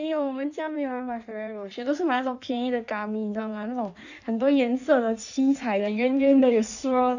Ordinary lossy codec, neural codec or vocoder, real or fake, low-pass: none; codec, 16 kHz in and 24 kHz out, 1.1 kbps, FireRedTTS-2 codec; fake; 7.2 kHz